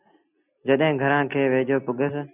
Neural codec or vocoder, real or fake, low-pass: none; real; 3.6 kHz